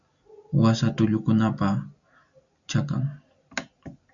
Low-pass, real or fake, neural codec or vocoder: 7.2 kHz; real; none